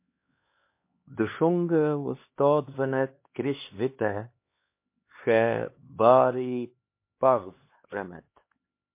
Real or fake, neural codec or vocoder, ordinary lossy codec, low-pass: fake; codec, 16 kHz, 2 kbps, X-Codec, HuBERT features, trained on LibriSpeech; MP3, 24 kbps; 3.6 kHz